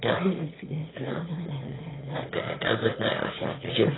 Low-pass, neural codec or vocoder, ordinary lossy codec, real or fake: 7.2 kHz; autoencoder, 22.05 kHz, a latent of 192 numbers a frame, VITS, trained on one speaker; AAC, 16 kbps; fake